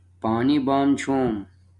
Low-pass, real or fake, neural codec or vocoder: 10.8 kHz; real; none